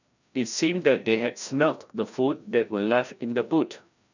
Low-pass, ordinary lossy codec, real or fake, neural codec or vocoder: 7.2 kHz; none; fake; codec, 16 kHz, 1 kbps, FreqCodec, larger model